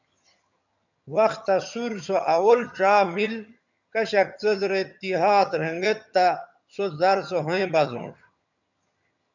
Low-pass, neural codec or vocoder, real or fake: 7.2 kHz; vocoder, 22.05 kHz, 80 mel bands, HiFi-GAN; fake